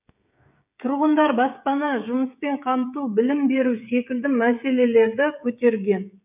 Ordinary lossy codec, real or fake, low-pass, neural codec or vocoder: none; fake; 3.6 kHz; codec, 16 kHz, 16 kbps, FreqCodec, smaller model